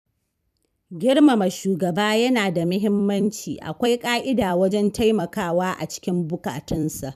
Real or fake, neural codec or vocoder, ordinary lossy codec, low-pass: fake; vocoder, 44.1 kHz, 128 mel bands every 256 samples, BigVGAN v2; none; 14.4 kHz